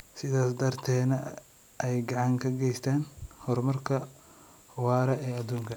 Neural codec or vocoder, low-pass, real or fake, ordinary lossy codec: none; none; real; none